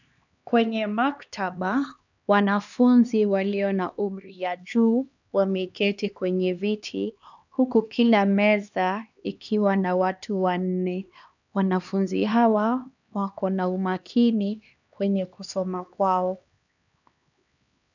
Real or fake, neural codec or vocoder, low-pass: fake; codec, 16 kHz, 2 kbps, X-Codec, HuBERT features, trained on LibriSpeech; 7.2 kHz